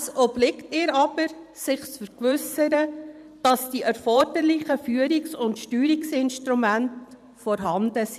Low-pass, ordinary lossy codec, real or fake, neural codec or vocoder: 14.4 kHz; none; fake; vocoder, 44.1 kHz, 128 mel bands every 512 samples, BigVGAN v2